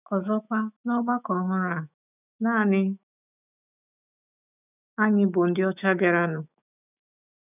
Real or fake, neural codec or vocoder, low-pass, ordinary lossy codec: fake; autoencoder, 48 kHz, 128 numbers a frame, DAC-VAE, trained on Japanese speech; 3.6 kHz; none